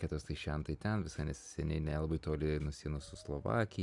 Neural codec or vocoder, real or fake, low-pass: none; real; 10.8 kHz